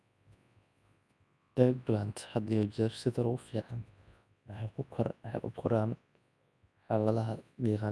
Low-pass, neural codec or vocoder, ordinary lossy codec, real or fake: none; codec, 24 kHz, 0.9 kbps, WavTokenizer, large speech release; none; fake